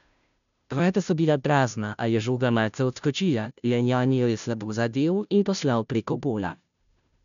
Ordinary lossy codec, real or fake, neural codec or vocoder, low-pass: none; fake; codec, 16 kHz, 0.5 kbps, FunCodec, trained on Chinese and English, 25 frames a second; 7.2 kHz